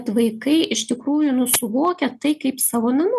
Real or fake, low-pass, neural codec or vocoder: real; 14.4 kHz; none